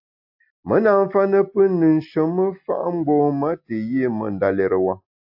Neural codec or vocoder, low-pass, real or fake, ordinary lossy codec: none; 5.4 kHz; real; AAC, 48 kbps